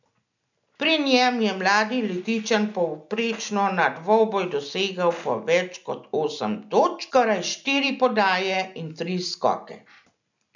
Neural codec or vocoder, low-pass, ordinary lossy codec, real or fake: none; 7.2 kHz; none; real